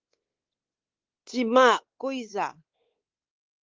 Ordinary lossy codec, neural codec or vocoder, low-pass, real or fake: Opus, 16 kbps; codec, 16 kHz, 4 kbps, X-Codec, WavLM features, trained on Multilingual LibriSpeech; 7.2 kHz; fake